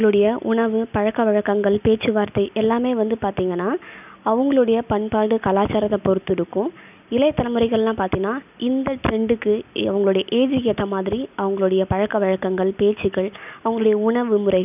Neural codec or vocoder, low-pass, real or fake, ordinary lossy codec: none; 3.6 kHz; real; none